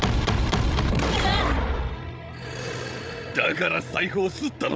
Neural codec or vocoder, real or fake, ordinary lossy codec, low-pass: codec, 16 kHz, 16 kbps, FreqCodec, larger model; fake; none; none